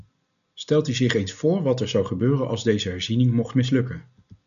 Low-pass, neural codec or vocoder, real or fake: 7.2 kHz; none; real